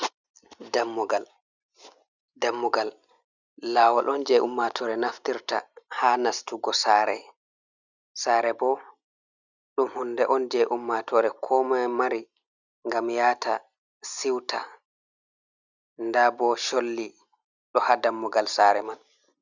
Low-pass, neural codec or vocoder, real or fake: 7.2 kHz; none; real